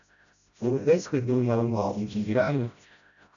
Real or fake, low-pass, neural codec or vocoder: fake; 7.2 kHz; codec, 16 kHz, 0.5 kbps, FreqCodec, smaller model